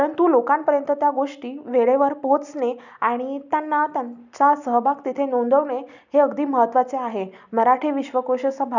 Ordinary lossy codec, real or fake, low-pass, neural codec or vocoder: none; real; 7.2 kHz; none